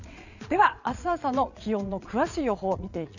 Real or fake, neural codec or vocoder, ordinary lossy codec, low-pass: fake; vocoder, 22.05 kHz, 80 mel bands, Vocos; none; 7.2 kHz